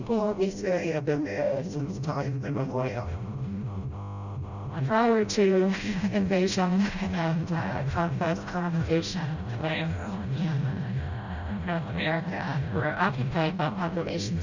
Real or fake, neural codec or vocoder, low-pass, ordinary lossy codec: fake; codec, 16 kHz, 0.5 kbps, FreqCodec, smaller model; 7.2 kHz; Opus, 64 kbps